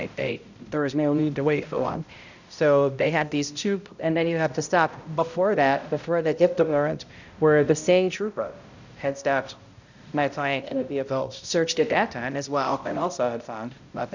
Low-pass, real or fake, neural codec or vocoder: 7.2 kHz; fake; codec, 16 kHz, 0.5 kbps, X-Codec, HuBERT features, trained on balanced general audio